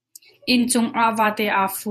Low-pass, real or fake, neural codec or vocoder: 14.4 kHz; fake; vocoder, 48 kHz, 128 mel bands, Vocos